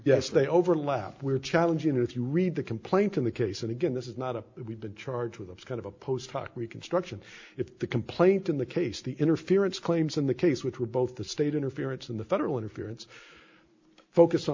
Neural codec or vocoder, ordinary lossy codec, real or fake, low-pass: none; MP3, 48 kbps; real; 7.2 kHz